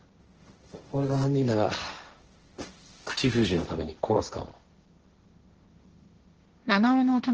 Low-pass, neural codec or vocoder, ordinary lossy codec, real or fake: 7.2 kHz; codec, 16 kHz, 1.1 kbps, Voila-Tokenizer; Opus, 16 kbps; fake